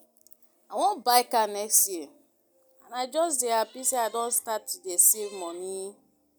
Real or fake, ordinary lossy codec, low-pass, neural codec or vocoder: real; none; none; none